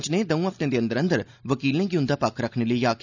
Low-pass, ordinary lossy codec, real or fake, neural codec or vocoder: 7.2 kHz; none; real; none